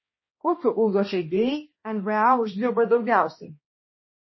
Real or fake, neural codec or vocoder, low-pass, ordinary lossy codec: fake; codec, 16 kHz, 1 kbps, X-Codec, HuBERT features, trained on balanced general audio; 7.2 kHz; MP3, 24 kbps